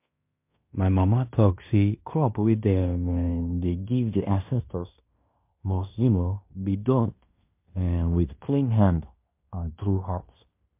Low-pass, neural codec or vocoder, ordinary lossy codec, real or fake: 3.6 kHz; codec, 16 kHz in and 24 kHz out, 0.9 kbps, LongCat-Audio-Codec, fine tuned four codebook decoder; MP3, 32 kbps; fake